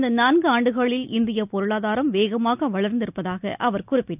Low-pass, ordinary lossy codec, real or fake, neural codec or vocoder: 3.6 kHz; none; real; none